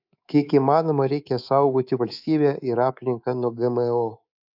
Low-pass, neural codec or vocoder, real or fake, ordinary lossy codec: 5.4 kHz; codec, 24 kHz, 3.1 kbps, DualCodec; fake; AAC, 48 kbps